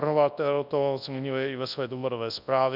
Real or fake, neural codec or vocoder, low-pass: fake; codec, 24 kHz, 0.9 kbps, WavTokenizer, large speech release; 5.4 kHz